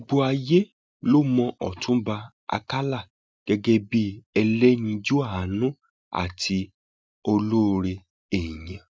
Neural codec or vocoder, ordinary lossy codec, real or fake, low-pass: none; none; real; none